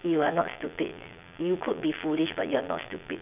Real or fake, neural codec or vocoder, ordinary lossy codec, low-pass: fake; vocoder, 22.05 kHz, 80 mel bands, Vocos; none; 3.6 kHz